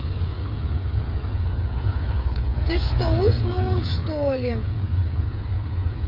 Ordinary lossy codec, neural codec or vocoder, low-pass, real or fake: MP3, 32 kbps; codec, 16 kHz, 8 kbps, FreqCodec, smaller model; 5.4 kHz; fake